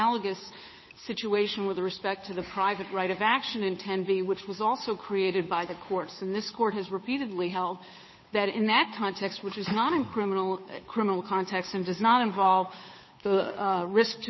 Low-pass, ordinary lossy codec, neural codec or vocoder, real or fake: 7.2 kHz; MP3, 24 kbps; codec, 16 kHz, 8 kbps, FunCodec, trained on Chinese and English, 25 frames a second; fake